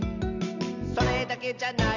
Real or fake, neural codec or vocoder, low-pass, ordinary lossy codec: real; none; 7.2 kHz; none